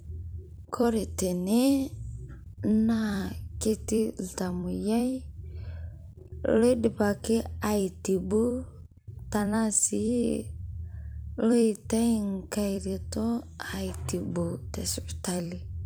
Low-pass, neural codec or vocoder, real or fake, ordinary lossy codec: none; vocoder, 44.1 kHz, 128 mel bands every 256 samples, BigVGAN v2; fake; none